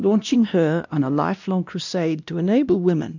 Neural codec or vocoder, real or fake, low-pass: codec, 16 kHz, 1 kbps, X-Codec, WavLM features, trained on Multilingual LibriSpeech; fake; 7.2 kHz